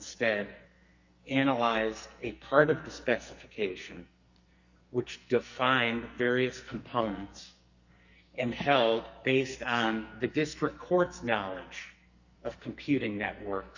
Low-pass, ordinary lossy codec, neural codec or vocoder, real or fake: 7.2 kHz; Opus, 64 kbps; codec, 44.1 kHz, 2.6 kbps, SNAC; fake